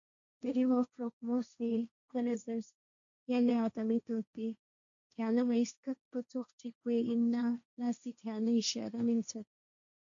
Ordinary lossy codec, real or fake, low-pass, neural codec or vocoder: MP3, 64 kbps; fake; 7.2 kHz; codec, 16 kHz, 1.1 kbps, Voila-Tokenizer